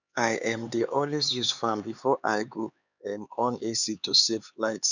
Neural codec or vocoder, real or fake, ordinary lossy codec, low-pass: codec, 16 kHz, 4 kbps, X-Codec, HuBERT features, trained on LibriSpeech; fake; none; 7.2 kHz